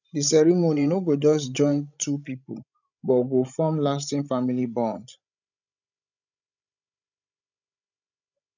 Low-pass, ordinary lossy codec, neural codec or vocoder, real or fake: 7.2 kHz; none; codec, 16 kHz, 16 kbps, FreqCodec, larger model; fake